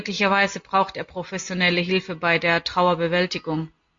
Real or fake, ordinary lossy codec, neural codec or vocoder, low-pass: real; MP3, 48 kbps; none; 7.2 kHz